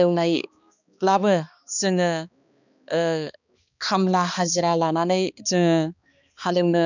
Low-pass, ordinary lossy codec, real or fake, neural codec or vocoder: 7.2 kHz; none; fake; codec, 16 kHz, 2 kbps, X-Codec, HuBERT features, trained on balanced general audio